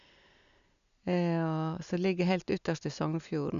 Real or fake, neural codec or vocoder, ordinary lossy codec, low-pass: real; none; none; 7.2 kHz